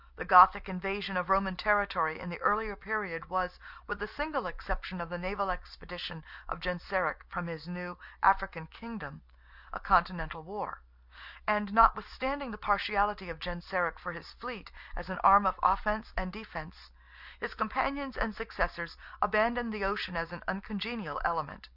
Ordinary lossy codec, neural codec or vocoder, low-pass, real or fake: Opus, 64 kbps; none; 5.4 kHz; real